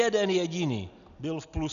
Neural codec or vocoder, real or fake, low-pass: none; real; 7.2 kHz